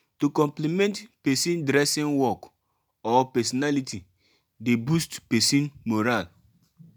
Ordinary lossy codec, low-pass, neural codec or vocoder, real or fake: none; none; none; real